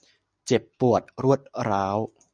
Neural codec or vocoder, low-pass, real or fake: none; 9.9 kHz; real